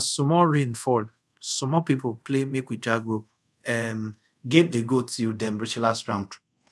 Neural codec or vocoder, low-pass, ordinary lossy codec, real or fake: codec, 24 kHz, 0.5 kbps, DualCodec; none; none; fake